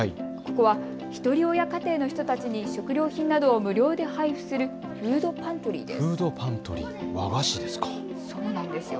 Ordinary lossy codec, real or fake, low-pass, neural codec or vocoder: none; real; none; none